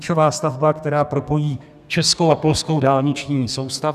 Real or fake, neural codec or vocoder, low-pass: fake; codec, 32 kHz, 1.9 kbps, SNAC; 14.4 kHz